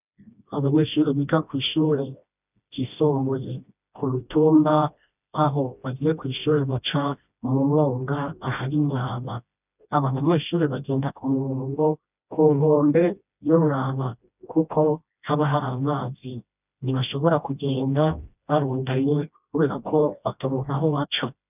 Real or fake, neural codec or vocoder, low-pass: fake; codec, 16 kHz, 1 kbps, FreqCodec, smaller model; 3.6 kHz